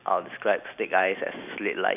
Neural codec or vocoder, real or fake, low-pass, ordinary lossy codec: none; real; 3.6 kHz; none